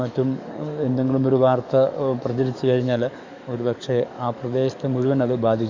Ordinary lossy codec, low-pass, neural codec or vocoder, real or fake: Opus, 64 kbps; 7.2 kHz; codec, 44.1 kHz, 7.8 kbps, DAC; fake